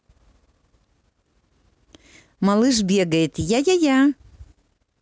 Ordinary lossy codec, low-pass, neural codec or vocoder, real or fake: none; none; none; real